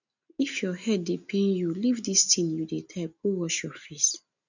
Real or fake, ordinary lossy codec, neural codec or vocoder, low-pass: real; none; none; 7.2 kHz